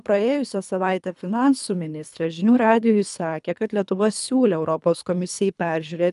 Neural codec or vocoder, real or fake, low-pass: codec, 24 kHz, 3 kbps, HILCodec; fake; 10.8 kHz